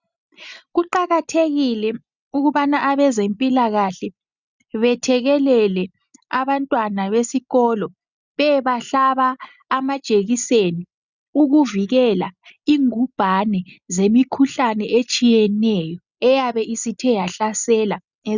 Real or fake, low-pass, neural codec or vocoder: real; 7.2 kHz; none